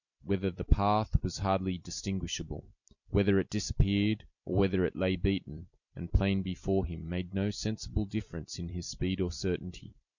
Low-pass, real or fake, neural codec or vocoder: 7.2 kHz; fake; vocoder, 44.1 kHz, 128 mel bands every 256 samples, BigVGAN v2